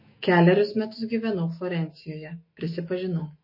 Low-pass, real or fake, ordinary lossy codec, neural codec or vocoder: 5.4 kHz; fake; MP3, 24 kbps; autoencoder, 48 kHz, 128 numbers a frame, DAC-VAE, trained on Japanese speech